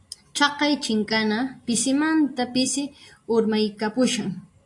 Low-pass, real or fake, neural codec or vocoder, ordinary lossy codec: 10.8 kHz; real; none; AAC, 48 kbps